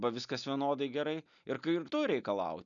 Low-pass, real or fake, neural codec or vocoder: 7.2 kHz; real; none